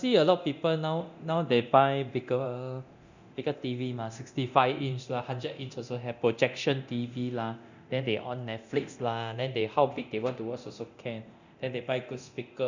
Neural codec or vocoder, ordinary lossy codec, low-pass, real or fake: codec, 24 kHz, 0.9 kbps, DualCodec; none; 7.2 kHz; fake